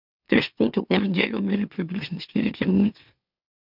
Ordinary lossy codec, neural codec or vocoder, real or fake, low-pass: none; autoencoder, 44.1 kHz, a latent of 192 numbers a frame, MeloTTS; fake; 5.4 kHz